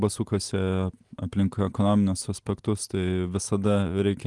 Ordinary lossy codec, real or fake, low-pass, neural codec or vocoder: Opus, 24 kbps; real; 10.8 kHz; none